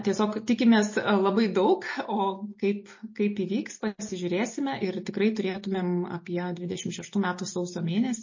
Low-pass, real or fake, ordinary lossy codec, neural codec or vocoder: 7.2 kHz; real; MP3, 32 kbps; none